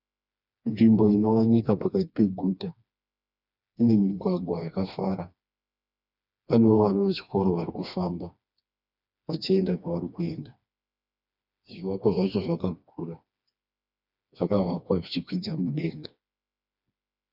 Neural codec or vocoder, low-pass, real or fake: codec, 16 kHz, 2 kbps, FreqCodec, smaller model; 5.4 kHz; fake